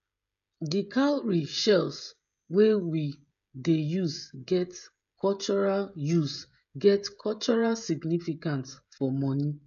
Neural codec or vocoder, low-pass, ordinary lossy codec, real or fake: codec, 16 kHz, 16 kbps, FreqCodec, smaller model; 7.2 kHz; none; fake